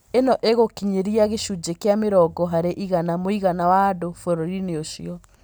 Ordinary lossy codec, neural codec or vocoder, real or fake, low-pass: none; none; real; none